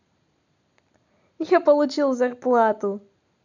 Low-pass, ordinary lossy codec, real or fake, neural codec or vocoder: 7.2 kHz; none; real; none